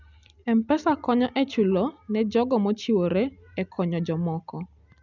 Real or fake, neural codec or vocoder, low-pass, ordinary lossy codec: real; none; 7.2 kHz; none